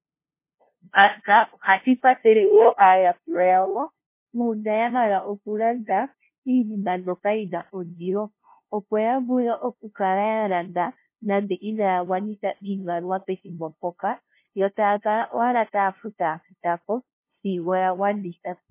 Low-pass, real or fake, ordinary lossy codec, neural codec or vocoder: 3.6 kHz; fake; MP3, 24 kbps; codec, 16 kHz, 0.5 kbps, FunCodec, trained on LibriTTS, 25 frames a second